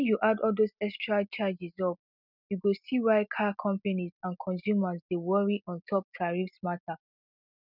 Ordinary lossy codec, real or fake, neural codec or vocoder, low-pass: none; real; none; 5.4 kHz